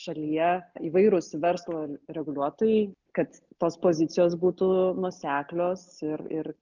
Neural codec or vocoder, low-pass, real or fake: none; 7.2 kHz; real